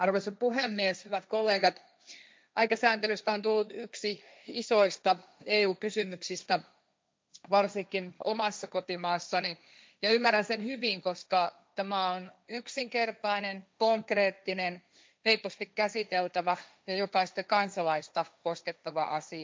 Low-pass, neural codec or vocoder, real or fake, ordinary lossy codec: none; codec, 16 kHz, 1.1 kbps, Voila-Tokenizer; fake; none